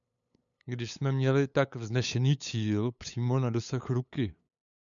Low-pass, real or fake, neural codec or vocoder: 7.2 kHz; fake; codec, 16 kHz, 8 kbps, FunCodec, trained on LibriTTS, 25 frames a second